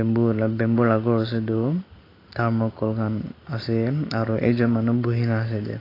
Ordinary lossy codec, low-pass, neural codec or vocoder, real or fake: AAC, 24 kbps; 5.4 kHz; none; real